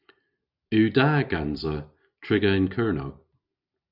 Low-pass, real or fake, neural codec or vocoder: 5.4 kHz; real; none